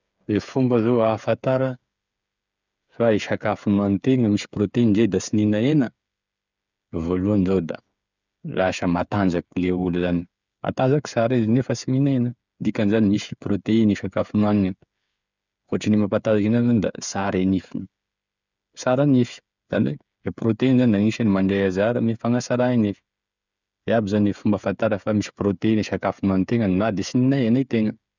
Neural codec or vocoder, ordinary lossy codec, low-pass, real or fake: codec, 16 kHz, 8 kbps, FreqCodec, smaller model; none; 7.2 kHz; fake